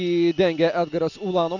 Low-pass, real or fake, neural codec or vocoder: 7.2 kHz; real; none